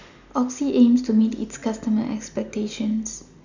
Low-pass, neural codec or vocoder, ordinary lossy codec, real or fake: 7.2 kHz; none; none; real